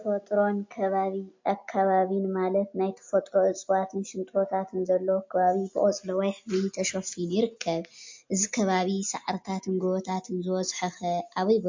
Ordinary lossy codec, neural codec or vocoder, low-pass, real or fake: MP3, 48 kbps; none; 7.2 kHz; real